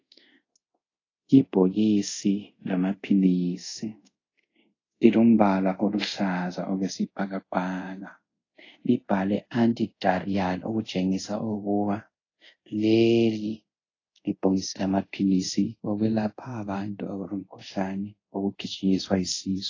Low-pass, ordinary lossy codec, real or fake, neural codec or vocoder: 7.2 kHz; AAC, 32 kbps; fake; codec, 24 kHz, 0.5 kbps, DualCodec